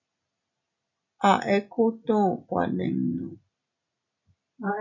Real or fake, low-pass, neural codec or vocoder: real; 7.2 kHz; none